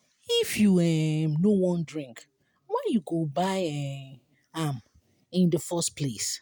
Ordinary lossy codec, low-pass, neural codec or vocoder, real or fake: none; none; none; real